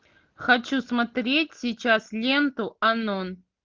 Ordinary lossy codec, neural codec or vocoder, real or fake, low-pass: Opus, 24 kbps; none; real; 7.2 kHz